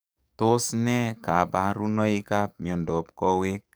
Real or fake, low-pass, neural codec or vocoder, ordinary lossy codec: fake; none; codec, 44.1 kHz, 7.8 kbps, DAC; none